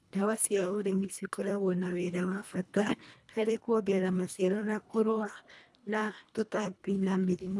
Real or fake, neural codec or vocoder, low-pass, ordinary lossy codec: fake; codec, 24 kHz, 1.5 kbps, HILCodec; none; none